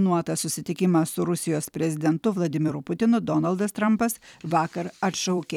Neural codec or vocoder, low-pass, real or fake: vocoder, 44.1 kHz, 128 mel bands every 256 samples, BigVGAN v2; 19.8 kHz; fake